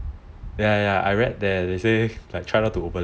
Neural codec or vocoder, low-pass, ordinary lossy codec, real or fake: none; none; none; real